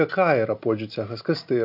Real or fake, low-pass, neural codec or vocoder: real; 5.4 kHz; none